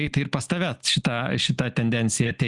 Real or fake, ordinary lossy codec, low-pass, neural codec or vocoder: real; Opus, 64 kbps; 10.8 kHz; none